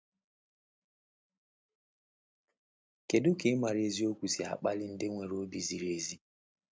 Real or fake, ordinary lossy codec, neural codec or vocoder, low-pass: real; none; none; none